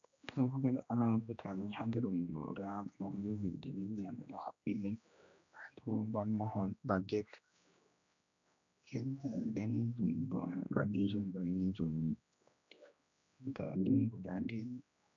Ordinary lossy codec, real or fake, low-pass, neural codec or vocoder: AAC, 64 kbps; fake; 7.2 kHz; codec, 16 kHz, 1 kbps, X-Codec, HuBERT features, trained on general audio